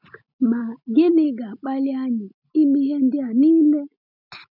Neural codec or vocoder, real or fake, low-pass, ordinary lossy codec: none; real; 5.4 kHz; none